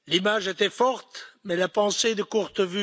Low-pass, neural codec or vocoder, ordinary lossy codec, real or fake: none; none; none; real